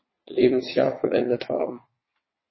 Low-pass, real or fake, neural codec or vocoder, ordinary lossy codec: 7.2 kHz; fake; vocoder, 22.05 kHz, 80 mel bands, WaveNeXt; MP3, 24 kbps